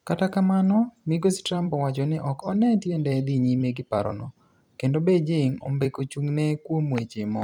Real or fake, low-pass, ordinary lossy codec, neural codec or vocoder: fake; 19.8 kHz; none; vocoder, 44.1 kHz, 128 mel bands every 256 samples, BigVGAN v2